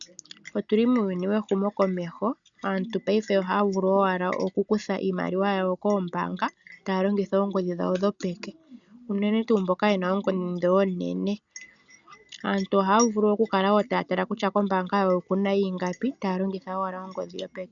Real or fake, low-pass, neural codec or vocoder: real; 7.2 kHz; none